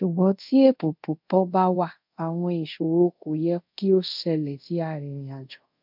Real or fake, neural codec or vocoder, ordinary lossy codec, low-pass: fake; codec, 24 kHz, 0.5 kbps, DualCodec; MP3, 48 kbps; 5.4 kHz